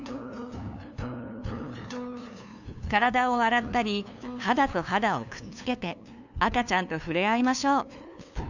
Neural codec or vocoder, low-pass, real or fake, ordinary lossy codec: codec, 16 kHz, 2 kbps, FunCodec, trained on LibriTTS, 25 frames a second; 7.2 kHz; fake; none